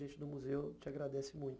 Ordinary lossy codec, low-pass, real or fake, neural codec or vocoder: none; none; real; none